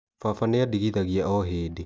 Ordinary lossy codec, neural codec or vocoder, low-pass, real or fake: none; none; none; real